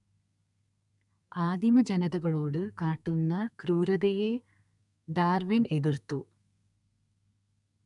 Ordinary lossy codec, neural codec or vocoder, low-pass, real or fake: none; codec, 32 kHz, 1.9 kbps, SNAC; 10.8 kHz; fake